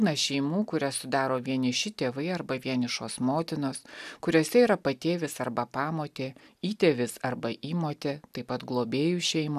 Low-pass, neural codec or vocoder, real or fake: 14.4 kHz; none; real